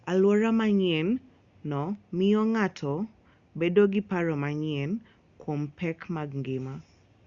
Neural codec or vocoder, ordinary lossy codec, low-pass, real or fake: none; Opus, 64 kbps; 7.2 kHz; real